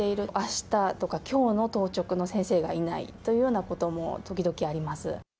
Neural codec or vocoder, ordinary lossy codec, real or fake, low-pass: none; none; real; none